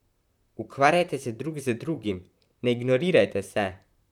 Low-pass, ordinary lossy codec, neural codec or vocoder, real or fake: 19.8 kHz; none; vocoder, 44.1 kHz, 128 mel bands, Pupu-Vocoder; fake